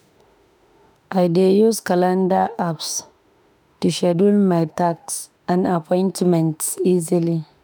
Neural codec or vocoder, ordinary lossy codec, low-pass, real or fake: autoencoder, 48 kHz, 32 numbers a frame, DAC-VAE, trained on Japanese speech; none; none; fake